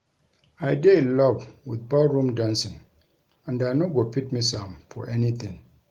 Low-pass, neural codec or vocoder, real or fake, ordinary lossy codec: 19.8 kHz; none; real; Opus, 24 kbps